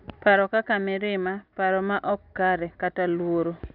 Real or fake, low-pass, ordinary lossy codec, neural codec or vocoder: real; 5.4 kHz; none; none